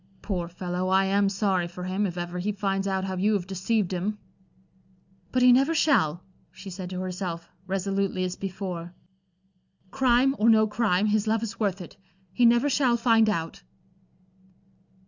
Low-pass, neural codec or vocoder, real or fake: 7.2 kHz; none; real